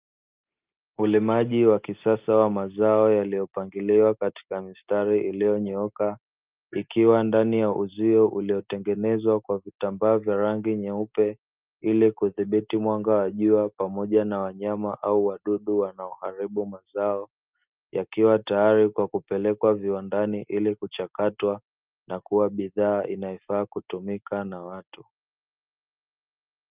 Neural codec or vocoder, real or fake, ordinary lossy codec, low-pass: none; real; Opus, 24 kbps; 3.6 kHz